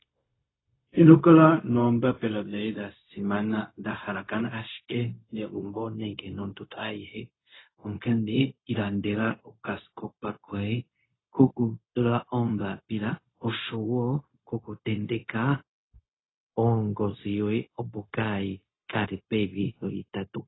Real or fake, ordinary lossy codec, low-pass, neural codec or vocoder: fake; AAC, 16 kbps; 7.2 kHz; codec, 16 kHz, 0.4 kbps, LongCat-Audio-Codec